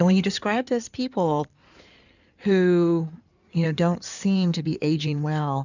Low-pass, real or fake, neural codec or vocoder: 7.2 kHz; fake; codec, 16 kHz in and 24 kHz out, 2.2 kbps, FireRedTTS-2 codec